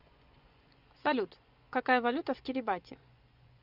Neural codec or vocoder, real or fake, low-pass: vocoder, 24 kHz, 100 mel bands, Vocos; fake; 5.4 kHz